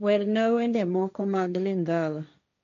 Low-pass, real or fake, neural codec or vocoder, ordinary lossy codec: 7.2 kHz; fake; codec, 16 kHz, 1.1 kbps, Voila-Tokenizer; none